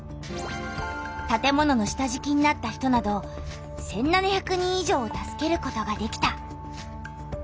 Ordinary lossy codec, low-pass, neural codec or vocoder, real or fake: none; none; none; real